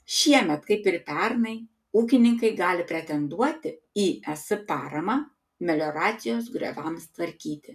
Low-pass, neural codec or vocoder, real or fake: 14.4 kHz; none; real